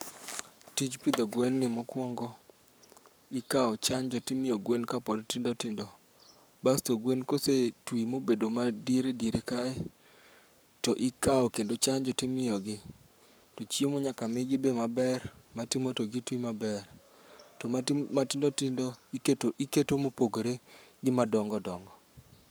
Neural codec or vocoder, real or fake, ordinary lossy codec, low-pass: codec, 44.1 kHz, 7.8 kbps, Pupu-Codec; fake; none; none